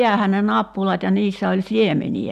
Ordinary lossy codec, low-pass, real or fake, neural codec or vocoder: AAC, 96 kbps; 14.4 kHz; real; none